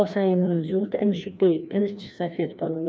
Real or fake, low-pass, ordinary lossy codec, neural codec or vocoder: fake; none; none; codec, 16 kHz, 1 kbps, FreqCodec, larger model